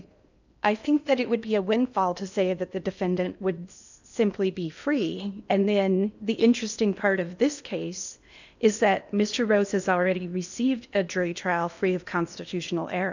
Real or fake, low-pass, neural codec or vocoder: fake; 7.2 kHz; codec, 16 kHz in and 24 kHz out, 0.8 kbps, FocalCodec, streaming, 65536 codes